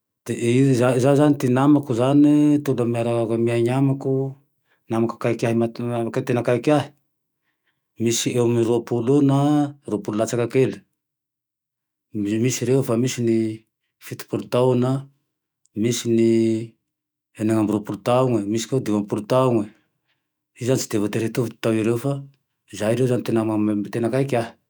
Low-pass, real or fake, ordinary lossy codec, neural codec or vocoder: none; fake; none; autoencoder, 48 kHz, 128 numbers a frame, DAC-VAE, trained on Japanese speech